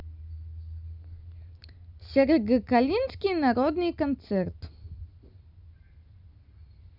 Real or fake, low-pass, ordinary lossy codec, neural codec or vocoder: real; 5.4 kHz; none; none